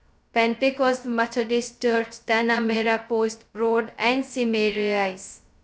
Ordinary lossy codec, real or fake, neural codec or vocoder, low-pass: none; fake; codec, 16 kHz, 0.2 kbps, FocalCodec; none